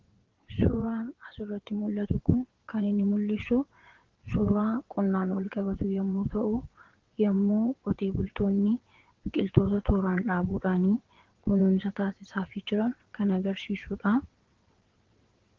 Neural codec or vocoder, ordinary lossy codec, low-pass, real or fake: vocoder, 22.05 kHz, 80 mel bands, WaveNeXt; Opus, 16 kbps; 7.2 kHz; fake